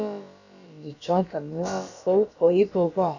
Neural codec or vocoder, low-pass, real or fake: codec, 16 kHz, about 1 kbps, DyCAST, with the encoder's durations; 7.2 kHz; fake